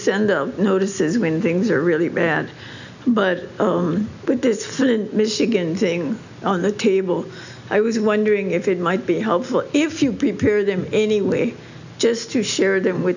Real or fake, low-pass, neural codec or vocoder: real; 7.2 kHz; none